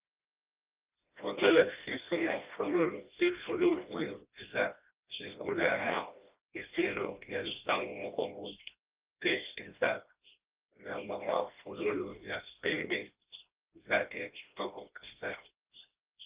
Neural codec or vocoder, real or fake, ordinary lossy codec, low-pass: codec, 16 kHz, 1 kbps, FreqCodec, smaller model; fake; Opus, 32 kbps; 3.6 kHz